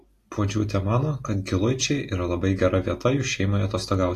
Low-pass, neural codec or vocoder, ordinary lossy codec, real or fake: 14.4 kHz; none; AAC, 48 kbps; real